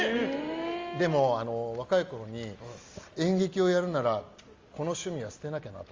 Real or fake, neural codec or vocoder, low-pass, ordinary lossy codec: real; none; 7.2 kHz; Opus, 32 kbps